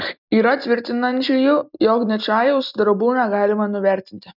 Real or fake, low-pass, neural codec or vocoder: real; 5.4 kHz; none